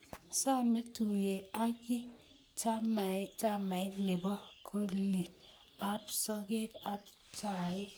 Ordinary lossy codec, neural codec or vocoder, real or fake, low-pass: none; codec, 44.1 kHz, 3.4 kbps, Pupu-Codec; fake; none